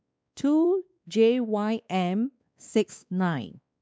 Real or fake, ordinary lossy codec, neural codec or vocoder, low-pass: fake; none; codec, 16 kHz, 2 kbps, X-Codec, WavLM features, trained on Multilingual LibriSpeech; none